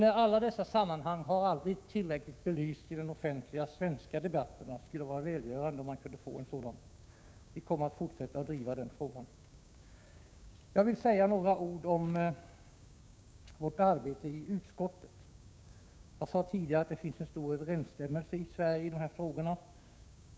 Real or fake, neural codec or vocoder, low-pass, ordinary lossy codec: fake; codec, 16 kHz, 6 kbps, DAC; none; none